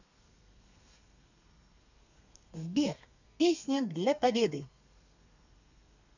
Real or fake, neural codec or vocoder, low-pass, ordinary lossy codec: fake; codec, 32 kHz, 1.9 kbps, SNAC; 7.2 kHz; none